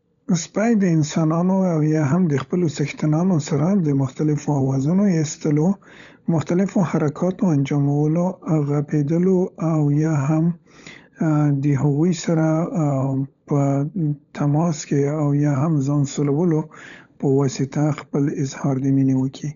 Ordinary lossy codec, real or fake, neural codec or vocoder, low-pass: none; fake; codec, 16 kHz, 16 kbps, FunCodec, trained on LibriTTS, 50 frames a second; 7.2 kHz